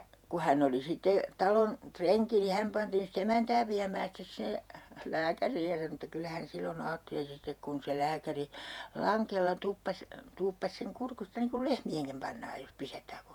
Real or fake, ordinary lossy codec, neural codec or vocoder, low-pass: fake; none; vocoder, 48 kHz, 128 mel bands, Vocos; 19.8 kHz